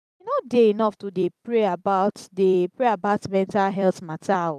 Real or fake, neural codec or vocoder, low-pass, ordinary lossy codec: fake; vocoder, 44.1 kHz, 128 mel bands every 256 samples, BigVGAN v2; 14.4 kHz; none